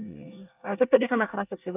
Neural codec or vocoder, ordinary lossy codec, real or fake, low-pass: codec, 24 kHz, 1 kbps, SNAC; none; fake; 3.6 kHz